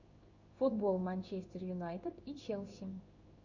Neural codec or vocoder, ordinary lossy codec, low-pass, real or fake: codec, 16 kHz in and 24 kHz out, 1 kbps, XY-Tokenizer; Opus, 64 kbps; 7.2 kHz; fake